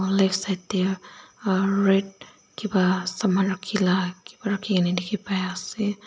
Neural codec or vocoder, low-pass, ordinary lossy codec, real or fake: none; none; none; real